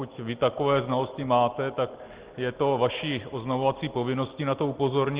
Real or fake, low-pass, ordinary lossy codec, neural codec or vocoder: real; 3.6 kHz; Opus, 16 kbps; none